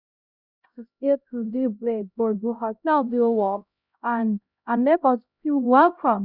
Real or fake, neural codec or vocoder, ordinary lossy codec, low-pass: fake; codec, 16 kHz, 0.5 kbps, X-Codec, HuBERT features, trained on LibriSpeech; none; 5.4 kHz